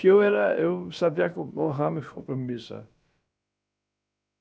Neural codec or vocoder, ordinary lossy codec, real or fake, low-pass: codec, 16 kHz, about 1 kbps, DyCAST, with the encoder's durations; none; fake; none